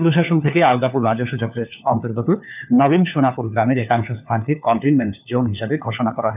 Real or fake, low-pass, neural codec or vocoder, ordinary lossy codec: fake; 3.6 kHz; codec, 16 kHz, 4 kbps, FunCodec, trained on LibriTTS, 50 frames a second; none